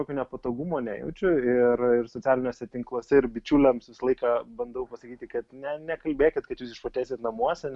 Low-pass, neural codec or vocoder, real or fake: 10.8 kHz; none; real